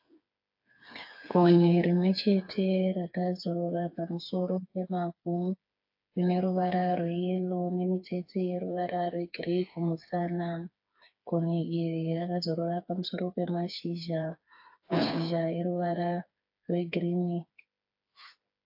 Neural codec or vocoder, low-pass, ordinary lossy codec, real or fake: codec, 16 kHz, 4 kbps, FreqCodec, smaller model; 5.4 kHz; AAC, 48 kbps; fake